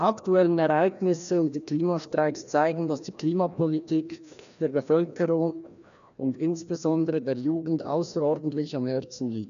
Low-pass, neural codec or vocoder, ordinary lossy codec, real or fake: 7.2 kHz; codec, 16 kHz, 1 kbps, FreqCodec, larger model; none; fake